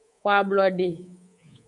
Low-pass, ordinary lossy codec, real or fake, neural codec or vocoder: 10.8 kHz; MP3, 64 kbps; fake; codec, 24 kHz, 3.1 kbps, DualCodec